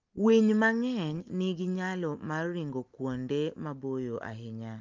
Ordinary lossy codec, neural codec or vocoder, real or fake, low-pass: Opus, 24 kbps; none; real; 7.2 kHz